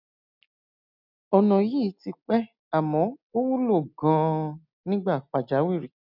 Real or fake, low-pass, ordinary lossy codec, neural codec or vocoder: real; 5.4 kHz; none; none